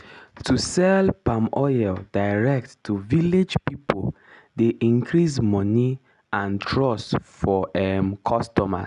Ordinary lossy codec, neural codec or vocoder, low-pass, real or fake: none; none; 10.8 kHz; real